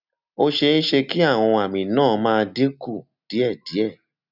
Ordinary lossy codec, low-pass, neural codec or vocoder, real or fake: none; 5.4 kHz; none; real